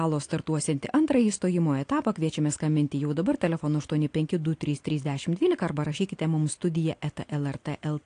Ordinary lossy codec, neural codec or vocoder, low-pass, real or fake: AAC, 48 kbps; none; 9.9 kHz; real